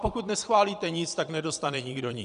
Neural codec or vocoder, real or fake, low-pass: vocoder, 22.05 kHz, 80 mel bands, Vocos; fake; 9.9 kHz